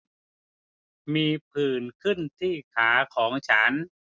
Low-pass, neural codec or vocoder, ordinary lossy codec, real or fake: none; none; none; real